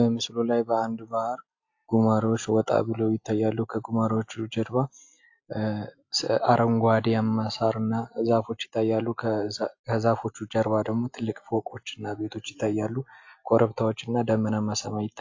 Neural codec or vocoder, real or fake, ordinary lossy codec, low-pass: none; real; AAC, 48 kbps; 7.2 kHz